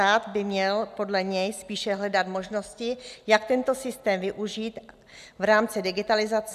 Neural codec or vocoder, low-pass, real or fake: none; 14.4 kHz; real